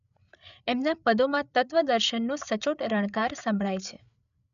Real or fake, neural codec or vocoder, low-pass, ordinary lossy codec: fake; codec, 16 kHz, 8 kbps, FreqCodec, larger model; 7.2 kHz; none